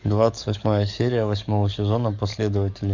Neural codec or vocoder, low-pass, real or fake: codec, 44.1 kHz, 7.8 kbps, DAC; 7.2 kHz; fake